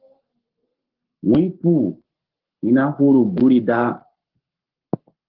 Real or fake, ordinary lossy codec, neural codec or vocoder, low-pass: fake; Opus, 16 kbps; codec, 44.1 kHz, 7.8 kbps, Pupu-Codec; 5.4 kHz